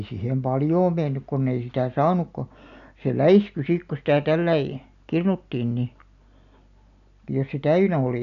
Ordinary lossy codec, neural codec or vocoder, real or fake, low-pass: none; none; real; 7.2 kHz